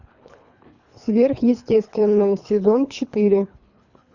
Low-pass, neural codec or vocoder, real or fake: 7.2 kHz; codec, 24 kHz, 3 kbps, HILCodec; fake